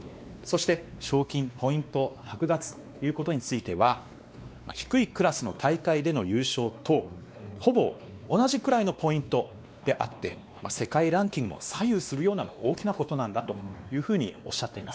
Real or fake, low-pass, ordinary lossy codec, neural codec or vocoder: fake; none; none; codec, 16 kHz, 2 kbps, X-Codec, WavLM features, trained on Multilingual LibriSpeech